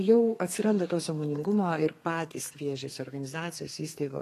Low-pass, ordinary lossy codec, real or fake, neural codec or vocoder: 14.4 kHz; AAC, 48 kbps; fake; codec, 32 kHz, 1.9 kbps, SNAC